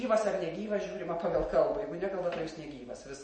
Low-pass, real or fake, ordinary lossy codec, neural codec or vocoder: 9.9 kHz; real; MP3, 32 kbps; none